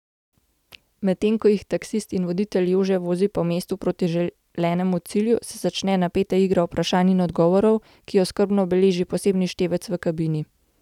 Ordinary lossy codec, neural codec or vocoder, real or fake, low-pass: none; none; real; 19.8 kHz